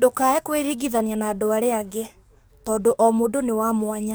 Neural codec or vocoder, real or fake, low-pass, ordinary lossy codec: codec, 44.1 kHz, 7.8 kbps, DAC; fake; none; none